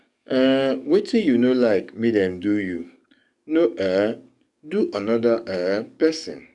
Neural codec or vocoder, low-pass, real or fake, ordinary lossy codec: codec, 44.1 kHz, 7.8 kbps, DAC; 10.8 kHz; fake; none